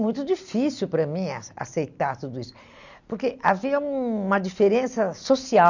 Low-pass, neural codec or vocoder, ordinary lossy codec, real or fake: 7.2 kHz; none; none; real